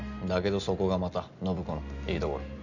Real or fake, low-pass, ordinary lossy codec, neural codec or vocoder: real; 7.2 kHz; none; none